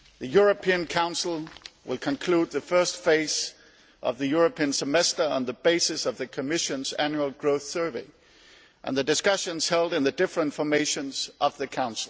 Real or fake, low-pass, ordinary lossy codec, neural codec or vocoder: real; none; none; none